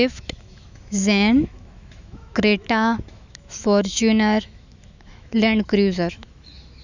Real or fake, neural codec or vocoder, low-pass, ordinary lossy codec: fake; autoencoder, 48 kHz, 128 numbers a frame, DAC-VAE, trained on Japanese speech; 7.2 kHz; none